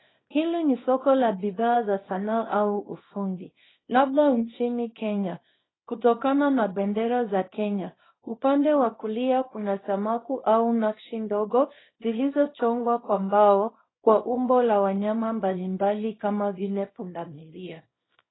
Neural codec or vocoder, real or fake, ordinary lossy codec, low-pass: codec, 24 kHz, 0.9 kbps, WavTokenizer, small release; fake; AAC, 16 kbps; 7.2 kHz